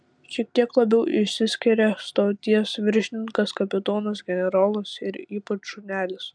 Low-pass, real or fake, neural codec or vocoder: 9.9 kHz; real; none